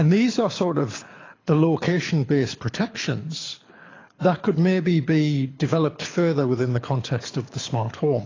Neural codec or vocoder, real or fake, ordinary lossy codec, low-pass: codec, 24 kHz, 6 kbps, HILCodec; fake; AAC, 32 kbps; 7.2 kHz